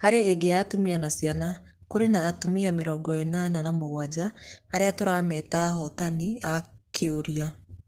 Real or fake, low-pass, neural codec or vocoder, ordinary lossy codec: fake; 14.4 kHz; codec, 32 kHz, 1.9 kbps, SNAC; Opus, 24 kbps